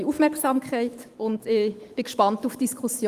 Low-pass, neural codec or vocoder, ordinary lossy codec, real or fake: 14.4 kHz; autoencoder, 48 kHz, 128 numbers a frame, DAC-VAE, trained on Japanese speech; Opus, 24 kbps; fake